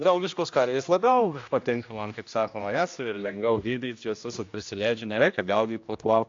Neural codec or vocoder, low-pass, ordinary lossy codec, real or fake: codec, 16 kHz, 1 kbps, X-Codec, HuBERT features, trained on general audio; 7.2 kHz; AAC, 48 kbps; fake